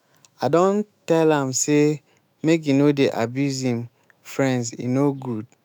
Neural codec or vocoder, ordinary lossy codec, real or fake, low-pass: autoencoder, 48 kHz, 128 numbers a frame, DAC-VAE, trained on Japanese speech; none; fake; none